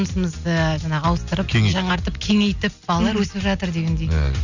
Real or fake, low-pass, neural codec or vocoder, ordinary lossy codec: real; 7.2 kHz; none; none